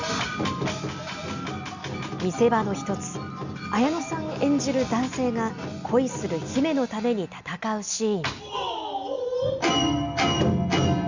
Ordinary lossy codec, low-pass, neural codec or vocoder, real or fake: Opus, 64 kbps; 7.2 kHz; none; real